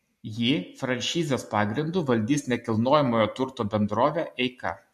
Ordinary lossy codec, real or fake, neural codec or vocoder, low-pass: MP3, 64 kbps; real; none; 14.4 kHz